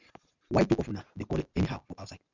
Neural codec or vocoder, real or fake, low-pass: none; real; 7.2 kHz